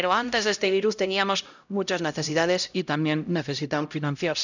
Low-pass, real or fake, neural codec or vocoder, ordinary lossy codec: 7.2 kHz; fake; codec, 16 kHz, 0.5 kbps, X-Codec, HuBERT features, trained on LibriSpeech; none